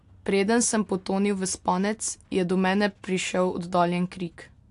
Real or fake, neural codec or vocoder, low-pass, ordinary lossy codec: real; none; 10.8 kHz; AAC, 64 kbps